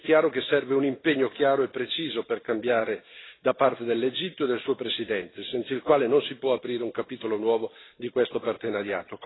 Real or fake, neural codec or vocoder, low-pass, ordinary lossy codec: real; none; 7.2 kHz; AAC, 16 kbps